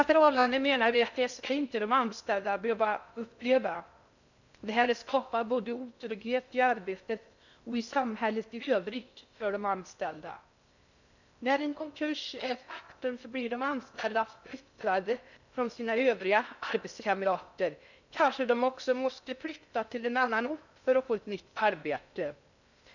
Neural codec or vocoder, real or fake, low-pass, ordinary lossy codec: codec, 16 kHz in and 24 kHz out, 0.6 kbps, FocalCodec, streaming, 2048 codes; fake; 7.2 kHz; none